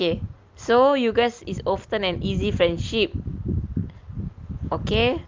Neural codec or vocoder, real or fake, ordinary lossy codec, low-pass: none; real; Opus, 32 kbps; 7.2 kHz